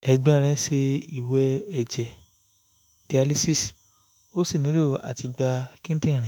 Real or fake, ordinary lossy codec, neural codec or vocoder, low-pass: fake; none; autoencoder, 48 kHz, 32 numbers a frame, DAC-VAE, trained on Japanese speech; none